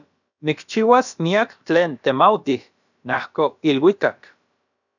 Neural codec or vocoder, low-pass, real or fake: codec, 16 kHz, about 1 kbps, DyCAST, with the encoder's durations; 7.2 kHz; fake